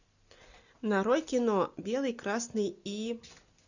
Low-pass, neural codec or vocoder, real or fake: 7.2 kHz; none; real